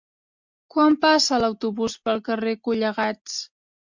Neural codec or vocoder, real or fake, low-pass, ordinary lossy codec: none; real; 7.2 kHz; MP3, 64 kbps